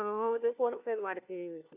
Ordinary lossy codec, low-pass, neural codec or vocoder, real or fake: AAC, 24 kbps; 3.6 kHz; codec, 16 kHz, 1 kbps, FunCodec, trained on LibriTTS, 50 frames a second; fake